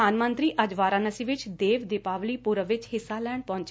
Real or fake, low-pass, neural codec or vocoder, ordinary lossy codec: real; none; none; none